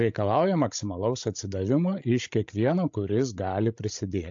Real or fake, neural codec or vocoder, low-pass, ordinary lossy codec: fake; codec, 16 kHz, 16 kbps, FreqCodec, larger model; 7.2 kHz; AAC, 64 kbps